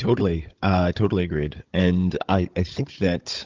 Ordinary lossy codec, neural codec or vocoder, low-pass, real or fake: Opus, 32 kbps; codec, 16 kHz, 16 kbps, FunCodec, trained on Chinese and English, 50 frames a second; 7.2 kHz; fake